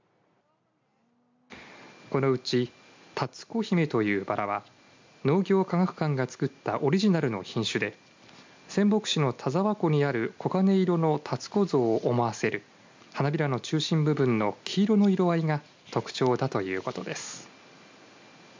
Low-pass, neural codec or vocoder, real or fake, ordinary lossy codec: 7.2 kHz; none; real; MP3, 64 kbps